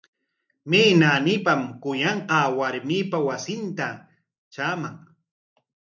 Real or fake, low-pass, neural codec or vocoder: real; 7.2 kHz; none